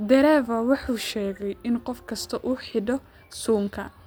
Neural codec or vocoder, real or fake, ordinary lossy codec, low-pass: none; real; none; none